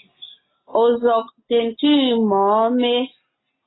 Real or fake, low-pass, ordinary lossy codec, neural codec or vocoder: real; 7.2 kHz; AAC, 16 kbps; none